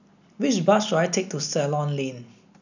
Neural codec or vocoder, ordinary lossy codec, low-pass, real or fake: none; none; 7.2 kHz; real